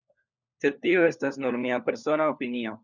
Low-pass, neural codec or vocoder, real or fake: 7.2 kHz; codec, 16 kHz, 4 kbps, FunCodec, trained on LibriTTS, 50 frames a second; fake